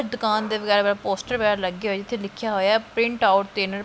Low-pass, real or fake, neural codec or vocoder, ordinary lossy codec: none; real; none; none